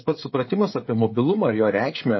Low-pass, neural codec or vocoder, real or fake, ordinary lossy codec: 7.2 kHz; codec, 16 kHz, 16 kbps, FreqCodec, smaller model; fake; MP3, 24 kbps